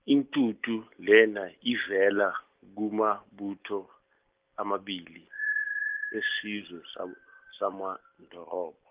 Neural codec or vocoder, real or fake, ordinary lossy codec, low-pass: none; real; Opus, 32 kbps; 3.6 kHz